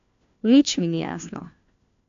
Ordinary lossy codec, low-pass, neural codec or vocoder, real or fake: AAC, 64 kbps; 7.2 kHz; codec, 16 kHz, 1 kbps, FunCodec, trained on LibriTTS, 50 frames a second; fake